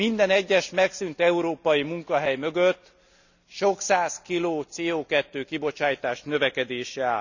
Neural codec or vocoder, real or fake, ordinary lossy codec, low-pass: none; real; none; 7.2 kHz